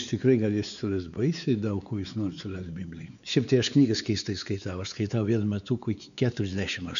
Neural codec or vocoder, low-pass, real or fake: codec, 16 kHz, 4 kbps, X-Codec, WavLM features, trained on Multilingual LibriSpeech; 7.2 kHz; fake